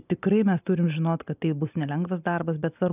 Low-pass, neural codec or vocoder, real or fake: 3.6 kHz; none; real